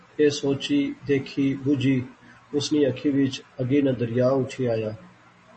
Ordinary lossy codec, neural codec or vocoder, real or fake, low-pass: MP3, 32 kbps; none; real; 9.9 kHz